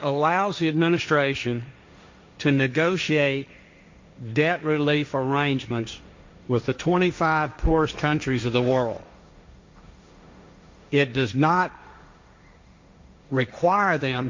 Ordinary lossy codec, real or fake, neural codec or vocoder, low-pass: MP3, 48 kbps; fake; codec, 16 kHz, 1.1 kbps, Voila-Tokenizer; 7.2 kHz